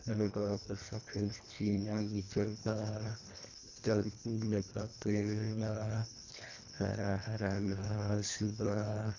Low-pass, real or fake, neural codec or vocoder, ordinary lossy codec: 7.2 kHz; fake; codec, 24 kHz, 1.5 kbps, HILCodec; none